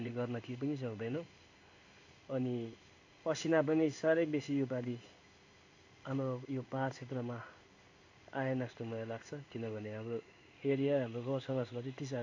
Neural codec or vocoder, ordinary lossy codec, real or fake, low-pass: codec, 16 kHz in and 24 kHz out, 1 kbps, XY-Tokenizer; AAC, 48 kbps; fake; 7.2 kHz